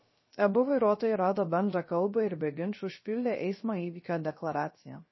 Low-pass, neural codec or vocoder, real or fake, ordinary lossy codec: 7.2 kHz; codec, 16 kHz, 0.3 kbps, FocalCodec; fake; MP3, 24 kbps